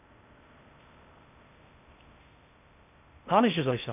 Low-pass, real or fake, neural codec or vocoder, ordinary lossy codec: 3.6 kHz; fake; codec, 16 kHz in and 24 kHz out, 0.6 kbps, FocalCodec, streaming, 4096 codes; none